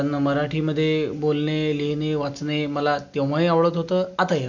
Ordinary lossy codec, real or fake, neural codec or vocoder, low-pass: none; real; none; 7.2 kHz